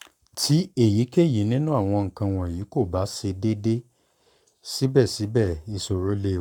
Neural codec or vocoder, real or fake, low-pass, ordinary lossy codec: codec, 44.1 kHz, 7.8 kbps, Pupu-Codec; fake; 19.8 kHz; none